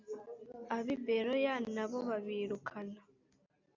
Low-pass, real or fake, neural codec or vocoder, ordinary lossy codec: 7.2 kHz; real; none; AAC, 48 kbps